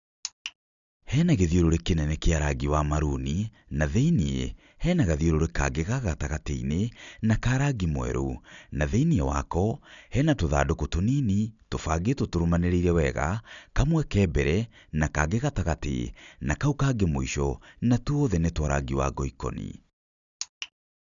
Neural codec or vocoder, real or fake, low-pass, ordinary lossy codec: none; real; 7.2 kHz; none